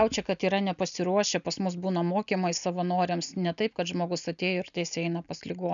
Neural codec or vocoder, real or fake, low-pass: none; real; 7.2 kHz